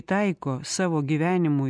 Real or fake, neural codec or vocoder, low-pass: real; none; 9.9 kHz